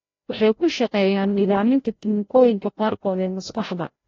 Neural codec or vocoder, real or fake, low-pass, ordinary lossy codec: codec, 16 kHz, 0.5 kbps, FreqCodec, larger model; fake; 7.2 kHz; AAC, 32 kbps